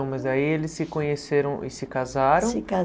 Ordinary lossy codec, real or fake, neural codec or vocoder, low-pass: none; real; none; none